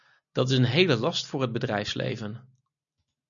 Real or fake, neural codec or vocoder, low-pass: real; none; 7.2 kHz